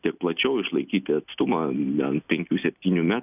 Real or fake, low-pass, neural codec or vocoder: real; 3.6 kHz; none